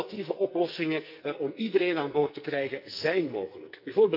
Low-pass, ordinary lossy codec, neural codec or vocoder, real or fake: 5.4 kHz; none; codec, 44.1 kHz, 2.6 kbps, SNAC; fake